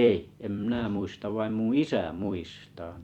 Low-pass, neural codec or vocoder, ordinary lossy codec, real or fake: 19.8 kHz; vocoder, 44.1 kHz, 128 mel bands every 256 samples, BigVGAN v2; Opus, 64 kbps; fake